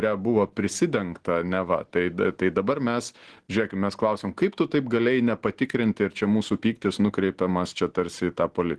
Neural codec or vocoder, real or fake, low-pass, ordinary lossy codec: none; real; 10.8 kHz; Opus, 16 kbps